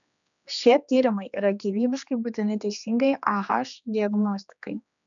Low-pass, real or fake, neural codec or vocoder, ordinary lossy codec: 7.2 kHz; fake; codec, 16 kHz, 2 kbps, X-Codec, HuBERT features, trained on general audio; MP3, 96 kbps